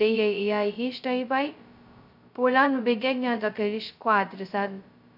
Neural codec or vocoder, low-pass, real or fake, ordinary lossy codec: codec, 16 kHz, 0.2 kbps, FocalCodec; 5.4 kHz; fake; none